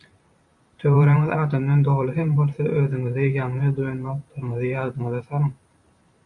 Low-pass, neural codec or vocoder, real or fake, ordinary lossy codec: 10.8 kHz; vocoder, 44.1 kHz, 128 mel bands every 512 samples, BigVGAN v2; fake; AAC, 64 kbps